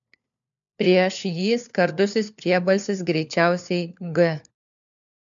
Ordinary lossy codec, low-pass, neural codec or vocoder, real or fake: MP3, 64 kbps; 7.2 kHz; codec, 16 kHz, 4 kbps, FunCodec, trained on LibriTTS, 50 frames a second; fake